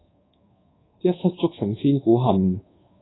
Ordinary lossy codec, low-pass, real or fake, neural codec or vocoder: AAC, 16 kbps; 7.2 kHz; fake; codec, 24 kHz, 1.2 kbps, DualCodec